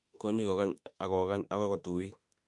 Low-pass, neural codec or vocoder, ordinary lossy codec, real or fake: 10.8 kHz; autoencoder, 48 kHz, 32 numbers a frame, DAC-VAE, trained on Japanese speech; MP3, 64 kbps; fake